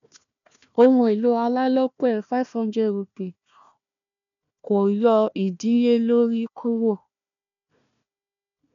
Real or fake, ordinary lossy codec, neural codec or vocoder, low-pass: fake; none; codec, 16 kHz, 1 kbps, FunCodec, trained on Chinese and English, 50 frames a second; 7.2 kHz